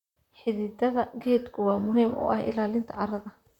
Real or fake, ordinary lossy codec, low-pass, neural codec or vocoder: fake; none; 19.8 kHz; vocoder, 44.1 kHz, 128 mel bands, Pupu-Vocoder